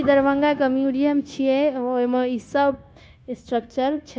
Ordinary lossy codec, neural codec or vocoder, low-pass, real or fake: none; codec, 16 kHz, 0.9 kbps, LongCat-Audio-Codec; none; fake